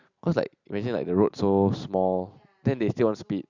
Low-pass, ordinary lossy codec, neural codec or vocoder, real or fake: 7.2 kHz; Opus, 64 kbps; none; real